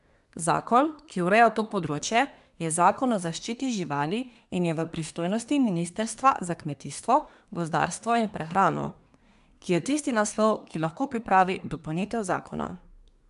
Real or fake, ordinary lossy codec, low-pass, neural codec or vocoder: fake; none; 10.8 kHz; codec, 24 kHz, 1 kbps, SNAC